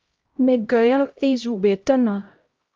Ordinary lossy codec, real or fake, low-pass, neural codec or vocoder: Opus, 24 kbps; fake; 7.2 kHz; codec, 16 kHz, 0.5 kbps, X-Codec, HuBERT features, trained on LibriSpeech